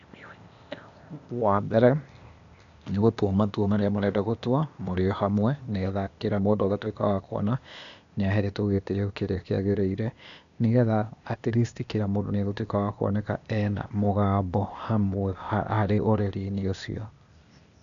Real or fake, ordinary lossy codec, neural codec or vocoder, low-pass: fake; MP3, 96 kbps; codec, 16 kHz, 0.8 kbps, ZipCodec; 7.2 kHz